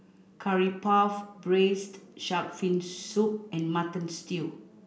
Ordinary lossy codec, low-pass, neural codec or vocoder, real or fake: none; none; none; real